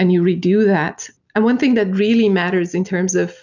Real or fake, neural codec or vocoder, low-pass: real; none; 7.2 kHz